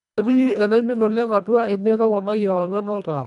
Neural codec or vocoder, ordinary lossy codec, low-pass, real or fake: codec, 24 kHz, 1.5 kbps, HILCodec; none; 10.8 kHz; fake